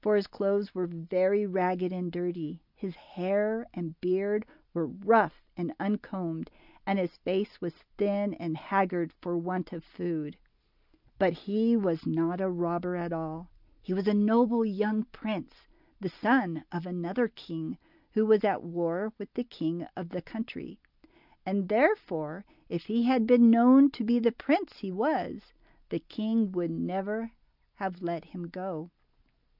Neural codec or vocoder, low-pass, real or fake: none; 5.4 kHz; real